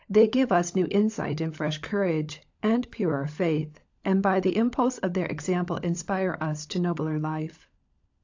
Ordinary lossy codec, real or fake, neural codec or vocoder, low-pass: AAC, 48 kbps; fake; codec, 16 kHz, 16 kbps, FunCodec, trained on LibriTTS, 50 frames a second; 7.2 kHz